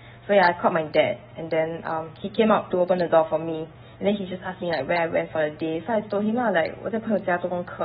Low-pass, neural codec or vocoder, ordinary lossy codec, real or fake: 19.8 kHz; autoencoder, 48 kHz, 128 numbers a frame, DAC-VAE, trained on Japanese speech; AAC, 16 kbps; fake